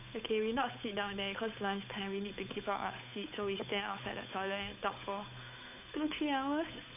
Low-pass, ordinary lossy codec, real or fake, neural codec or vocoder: 3.6 kHz; none; fake; codec, 16 kHz, 8 kbps, FunCodec, trained on Chinese and English, 25 frames a second